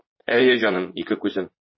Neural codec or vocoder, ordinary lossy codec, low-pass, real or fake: vocoder, 44.1 kHz, 80 mel bands, Vocos; MP3, 24 kbps; 7.2 kHz; fake